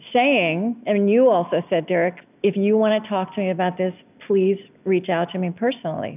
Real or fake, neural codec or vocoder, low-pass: real; none; 3.6 kHz